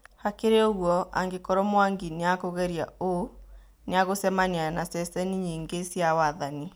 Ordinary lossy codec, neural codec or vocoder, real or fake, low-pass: none; none; real; none